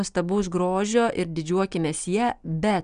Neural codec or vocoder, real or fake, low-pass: none; real; 9.9 kHz